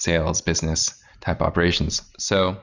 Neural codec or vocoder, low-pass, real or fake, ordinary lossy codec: none; 7.2 kHz; real; Opus, 64 kbps